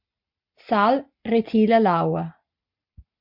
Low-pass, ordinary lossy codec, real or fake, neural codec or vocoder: 5.4 kHz; MP3, 48 kbps; real; none